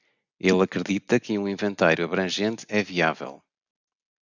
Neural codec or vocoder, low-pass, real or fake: none; 7.2 kHz; real